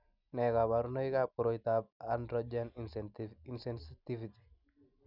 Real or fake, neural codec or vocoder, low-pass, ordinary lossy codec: real; none; 5.4 kHz; none